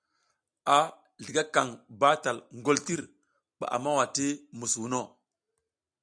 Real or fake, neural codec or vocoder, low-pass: real; none; 9.9 kHz